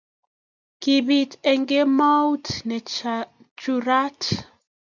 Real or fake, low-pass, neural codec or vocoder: real; 7.2 kHz; none